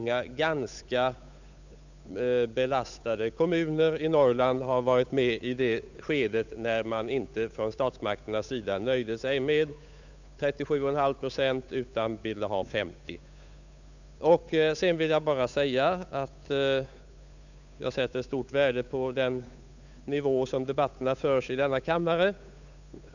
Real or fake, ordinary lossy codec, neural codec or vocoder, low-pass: fake; none; codec, 16 kHz, 8 kbps, FunCodec, trained on Chinese and English, 25 frames a second; 7.2 kHz